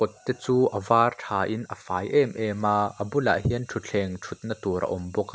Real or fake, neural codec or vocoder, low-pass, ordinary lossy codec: real; none; none; none